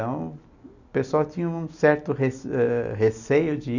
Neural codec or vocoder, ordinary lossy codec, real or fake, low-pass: none; none; real; 7.2 kHz